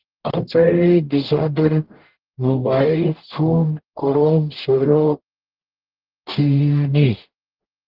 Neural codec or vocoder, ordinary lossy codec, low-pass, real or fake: codec, 44.1 kHz, 0.9 kbps, DAC; Opus, 16 kbps; 5.4 kHz; fake